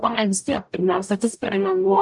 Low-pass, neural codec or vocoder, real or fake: 10.8 kHz; codec, 44.1 kHz, 0.9 kbps, DAC; fake